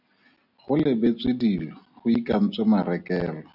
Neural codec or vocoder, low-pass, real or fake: none; 5.4 kHz; real